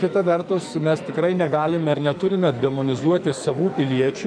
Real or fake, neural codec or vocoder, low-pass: fake; codec, 44.1 kHz, 2.6 kbps, SNAC; 9.9 kHz